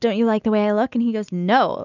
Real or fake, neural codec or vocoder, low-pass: real; none; 7.2 kHz